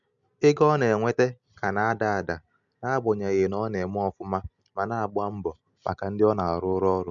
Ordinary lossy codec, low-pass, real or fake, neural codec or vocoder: MP3, 64 kbps; 7.2 kHz; real; none